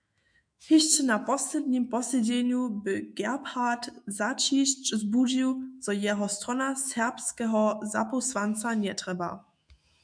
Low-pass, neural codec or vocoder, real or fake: 9.9 kHz; autoencoder, 48 kHz, 128 numbers a frame, DAC-VAE, trained on Japanese speech; fake